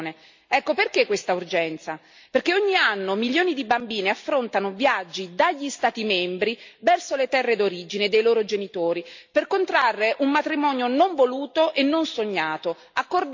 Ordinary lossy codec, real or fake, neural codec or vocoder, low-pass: MP3, 48 kbps; real; none; 7.2 kHz